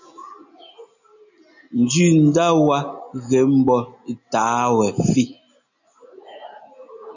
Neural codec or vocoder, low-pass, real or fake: none; 7.2 kHz; real